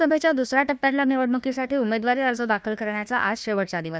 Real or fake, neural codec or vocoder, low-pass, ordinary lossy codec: fake; codec, 16 kHz, 1 kbps, FunCodec, trained on Chinese and English, 50 frames a second; none; none